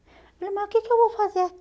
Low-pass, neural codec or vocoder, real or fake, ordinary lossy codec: none; none; real; none